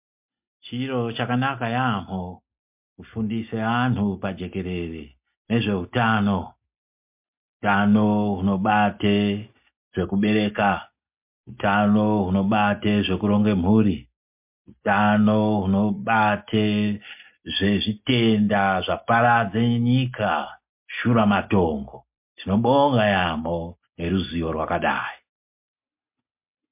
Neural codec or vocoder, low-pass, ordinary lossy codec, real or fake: none; 3.6 kHz; MP3, 32 kbps; real